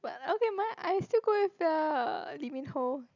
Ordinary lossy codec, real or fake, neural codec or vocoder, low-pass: none; real; none; 7.2 kHz